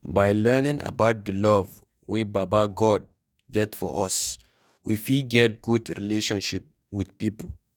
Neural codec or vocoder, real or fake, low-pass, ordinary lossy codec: codec, 44.1 kHz, 2.6 kbps, DAC; fake; 19.8 kHz; none